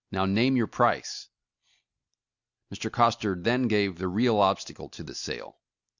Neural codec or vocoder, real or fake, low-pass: none; real; 7.2 kHz